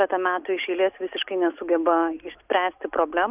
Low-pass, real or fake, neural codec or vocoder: 3.6 kHz; real; none